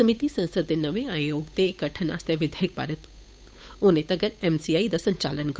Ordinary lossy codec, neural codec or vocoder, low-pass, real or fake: none; codec, 16 kHz, 8 kbps, FunCodec, trained on Chinese and English, 25 frames a second; none; fake